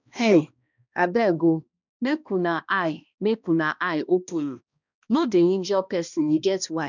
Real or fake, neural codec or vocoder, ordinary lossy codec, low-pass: fake; codec, 16 kHz, 1 kbps, X-Codec, HuBERT features, trained on balanced general audio; none; 7.2 kHz